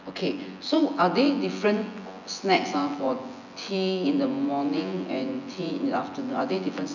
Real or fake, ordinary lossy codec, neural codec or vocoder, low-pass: fake; none; vocoder, 24 kHz, 100 mel bands, Vocos; 7.2 kHz